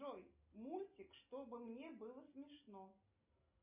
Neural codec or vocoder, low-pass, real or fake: none; 3.6 kHz; real